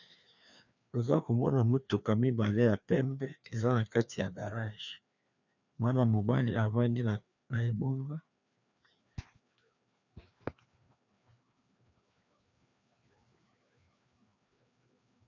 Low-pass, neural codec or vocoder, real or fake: 7.2 kHz; codec, 16 kHz, 2 kbps, FreqCodec, larger model; fake